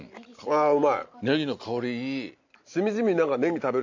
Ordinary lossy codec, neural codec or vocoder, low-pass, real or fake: MP3, 64 kbps; none; 7.2 kHz; real